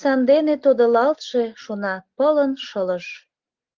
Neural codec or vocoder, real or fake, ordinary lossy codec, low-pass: none; real; Opus, 16 kbps; 7.2 kHz